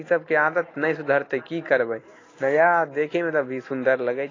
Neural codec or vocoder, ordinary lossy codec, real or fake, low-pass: none; AAC, 32 kbps; real; 7.2 kHz